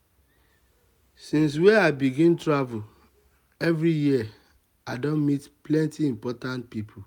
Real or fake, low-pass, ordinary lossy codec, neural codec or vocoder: real; 19.8 kHz; none; none